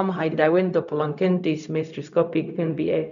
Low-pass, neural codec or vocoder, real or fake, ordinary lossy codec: 7.2 kHz; codec, 16 kHz, 0.4 kbps, LongCat-Audio-Codec; fake; none